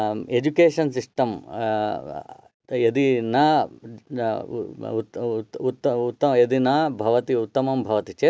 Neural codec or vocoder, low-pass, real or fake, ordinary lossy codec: none; none; real; none